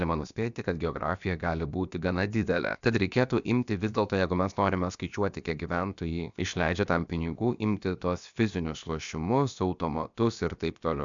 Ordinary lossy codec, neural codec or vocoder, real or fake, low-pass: MP3, 96 kbps; codec, 16 kHz, about 1 kbps, DyCAST, with the encoder's durations; fake; 7.2 kHz